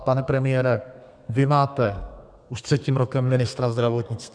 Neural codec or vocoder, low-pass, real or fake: codec, 32 kHz, 1.9 kbps, SNAC; 9.9 kHz; fake